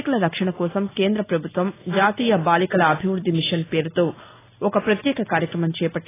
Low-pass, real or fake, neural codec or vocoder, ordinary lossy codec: 3.6 kHz; real; none; AAC, 16 kbps